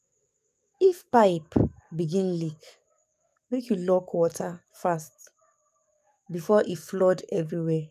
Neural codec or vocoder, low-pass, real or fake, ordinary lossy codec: codec, 44.1 kHz, 7.8 kbps, DAC; 14.4 kHz; fake; none